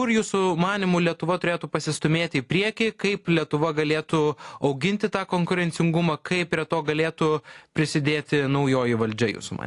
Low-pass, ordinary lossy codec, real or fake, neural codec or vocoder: 10.8 kHz; AAC, 48 kbps; real; none